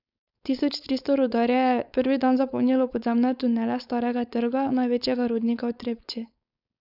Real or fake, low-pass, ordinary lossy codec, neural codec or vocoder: fake; 5.4 kHz; none; codec, 16 kHz, 4.8 kbps, FACodec